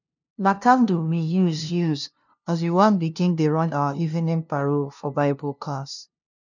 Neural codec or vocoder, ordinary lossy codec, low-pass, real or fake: codec, 16 kHz, 0.5 kbps, FunCodec, trained on LibriTTS, 25 frames a second; none; 7.2 kHz; fake